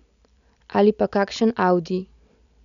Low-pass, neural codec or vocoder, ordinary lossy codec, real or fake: 7.2 kHz; none; none; real